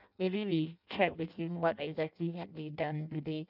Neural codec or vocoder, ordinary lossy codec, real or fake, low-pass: codec, 16 kHz in and 24 kHz out, 0.6 kbps, FireRedTTS-2 codec; none; fake; 5.4 kHz